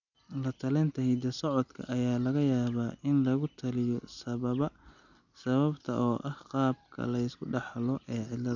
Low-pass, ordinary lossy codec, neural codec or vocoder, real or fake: 7.2 kHz; none; none; real